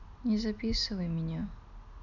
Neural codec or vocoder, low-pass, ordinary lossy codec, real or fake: none; 7.2 kHz; none; real